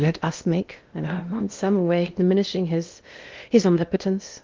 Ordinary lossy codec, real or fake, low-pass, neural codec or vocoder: Opus, 32 kbps; fake; 7.2 kHz; codec, 16 kHz in and 24 kHz out, 0.8 kbps, FocalCodec, streaming, 65536 codes